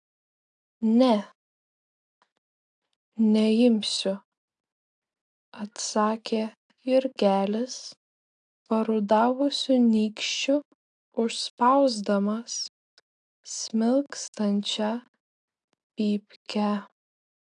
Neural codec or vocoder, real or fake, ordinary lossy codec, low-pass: none; real; AAC, 64 kbps; 9.9 kHz